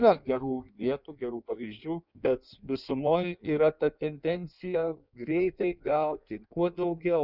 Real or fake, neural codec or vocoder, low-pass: fake; codec, 16 kHz in and 24 kHz out, 1.1 kbps, FireRedTTS-2 codec; 5.4 kHz